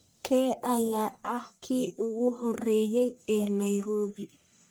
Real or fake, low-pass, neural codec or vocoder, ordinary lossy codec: fake; none; codec, 44.1 kHz, 1.7 kbps, Pupu-Codec; none